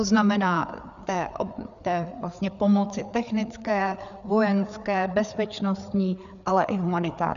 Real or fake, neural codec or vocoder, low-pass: fake; codec, 16 kHz, 4 kbps, FreqCodec, larger model; 7.2 kHz